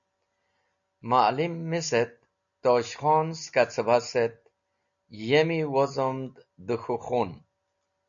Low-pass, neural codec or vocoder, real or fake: 7.2 kHz; none; real